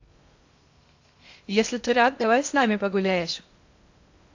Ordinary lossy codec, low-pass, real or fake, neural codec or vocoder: AAC, 48 kbps; 7.2 kHz; fake; codec, 16 kHz in and 24 kHz out, 0.6 kbps, FocalCodec, streaming, 2048 codes